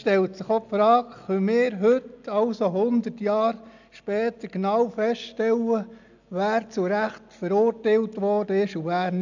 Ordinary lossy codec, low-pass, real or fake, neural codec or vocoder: none; 7.2 kHz; real; none